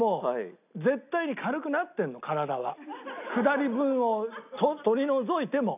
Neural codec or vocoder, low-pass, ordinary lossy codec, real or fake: none; 3.6 kHz; none; real